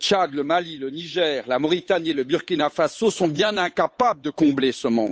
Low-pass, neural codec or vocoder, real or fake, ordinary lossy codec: none; codec, 16 kHz, 8 kbps, FunCodec, trained on Chinese and English, 25 frames a second; fake; none